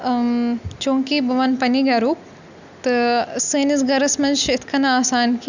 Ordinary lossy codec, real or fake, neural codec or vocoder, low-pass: none; real; none; 7.2 kHz